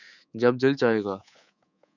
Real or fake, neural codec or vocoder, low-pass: fake; codec, 24 kHz, 3.1 kbps, DualCodec; 7.2 kHz